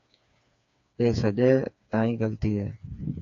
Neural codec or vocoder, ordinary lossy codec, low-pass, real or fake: codec, 16 kHz, 4 kbps, FreqCodec, smaller model; AAC, 64 kbps; 7.2 kHz; fake